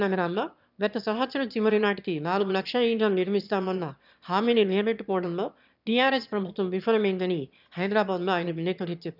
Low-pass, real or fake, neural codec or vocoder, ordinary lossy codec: 5.4 kHz; fake; autoencoder, 22.05 kHz, a latent of 192 numbers a frame, VITS, trained on one speaker; none